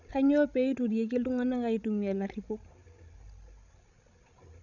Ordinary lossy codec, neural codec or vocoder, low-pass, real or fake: none; codec, 16 kHz, 16 kbps, FreqCodec, larger model; 7.2 kHz; fake